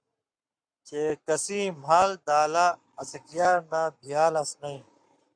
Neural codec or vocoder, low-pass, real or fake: codec, 44.1 kHz, 7.8 kbps, Pupu-Codec; 9.9 kHz; fake